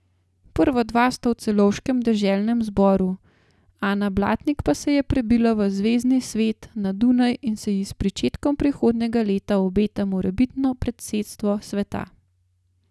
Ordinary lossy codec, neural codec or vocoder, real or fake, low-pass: none; none; real; none